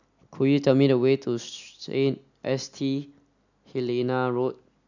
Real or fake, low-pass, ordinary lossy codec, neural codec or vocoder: real; 7.2 kHz; none; none